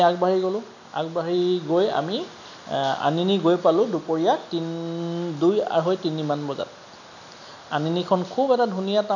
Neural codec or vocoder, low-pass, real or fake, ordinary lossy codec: none; 7.2 kHz; real; none